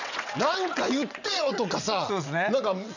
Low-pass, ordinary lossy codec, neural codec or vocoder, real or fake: 7.2 kHz; none; vocoder, 22.05 kHz, 80 mel bands, WaveNeXt; fake